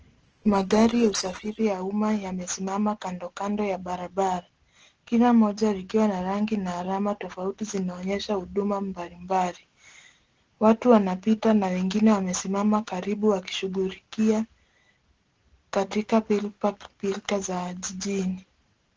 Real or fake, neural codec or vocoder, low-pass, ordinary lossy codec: real; none; 7.2 kHz; Opus, 16 kbps